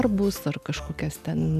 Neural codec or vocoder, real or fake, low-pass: none; real; 14.4 kHz